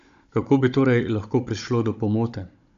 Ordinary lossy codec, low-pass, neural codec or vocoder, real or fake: MP3, 64 kbps; 7.2 kHz; codec, 16 kHz, 16 kbps, FunCodec, trained on Chinese and English, 50 frames a second; fake